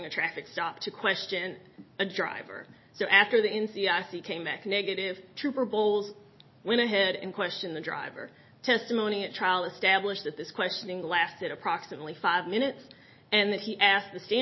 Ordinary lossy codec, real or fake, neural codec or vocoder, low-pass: MP3, 24 kbps; real; none; 7.2 kHz